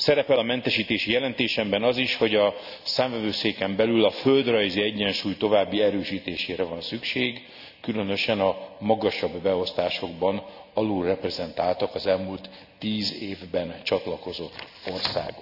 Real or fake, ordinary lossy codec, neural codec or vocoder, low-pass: real; none; none; 5.4 kHz